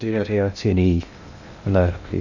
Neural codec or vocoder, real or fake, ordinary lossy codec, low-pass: codec, 16 kHz in and 24 kHz out, 0.6 kbps, FocalCodec, streaming, 2048 codes; fake; none; 7.2 kHz